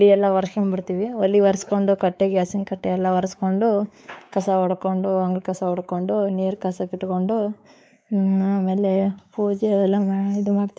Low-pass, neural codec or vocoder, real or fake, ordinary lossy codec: none; codec, 16 kHz, 4 kbps, X-Codec, WavLM features, trained on Multilingual LibriSpeech; fake; none